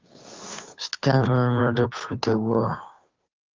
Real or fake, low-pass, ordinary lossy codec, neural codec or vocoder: fake; 7.2 kHz; Opus, 32 kbps; codec, 16 kHz, 2 kbps, FunCodec, trained on Chinese and English, 25 frames a second